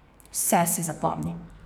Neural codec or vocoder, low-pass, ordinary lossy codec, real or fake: codec, 44.1 kHz, 2.6 kbps, SNAC; none; none; fake